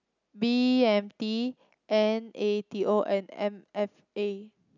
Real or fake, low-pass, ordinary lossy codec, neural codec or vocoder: real; 7.2 kHz; none; none